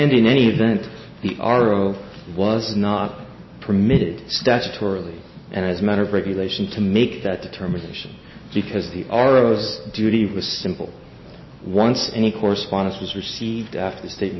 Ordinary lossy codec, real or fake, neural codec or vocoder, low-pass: MP3, 24 kbps; real; none; 7.2 kHz